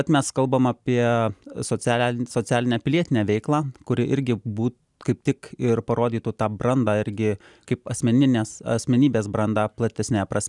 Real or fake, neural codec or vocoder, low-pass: real; none; 10.8 kHz